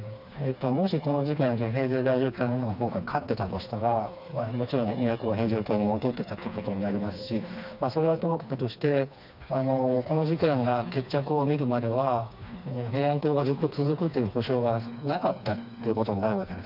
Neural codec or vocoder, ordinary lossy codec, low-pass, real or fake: codec, 16 kHz, 2 kbps, FreqCodec, smaller model; none; 5.4 kHz; fake